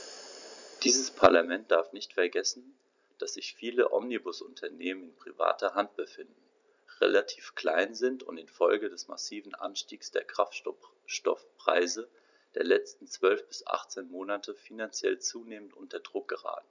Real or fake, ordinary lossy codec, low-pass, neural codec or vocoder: real; none; 7.2 kHz; none